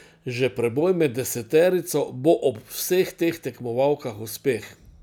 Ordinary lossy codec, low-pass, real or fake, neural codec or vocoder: none; none; real; none